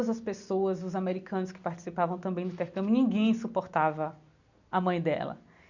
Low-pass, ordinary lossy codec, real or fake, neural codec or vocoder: 7.2 kHz; none; real; none